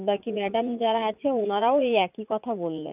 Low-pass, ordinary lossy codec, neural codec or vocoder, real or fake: 3.6 kHz; none; vocoder, 44.1 kHz, 80 mel bands, Vocos; fake